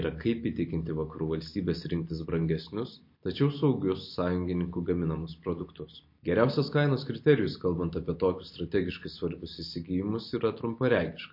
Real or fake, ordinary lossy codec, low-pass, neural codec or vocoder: real; MP3, 32 kbps; 5.4 kHz; none